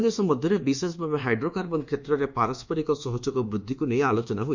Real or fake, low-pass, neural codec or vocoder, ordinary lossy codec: fake; 7.2 kHz; codec, 24 kHz, 1.2 kbps, DualCodec; Opus, 64 kbps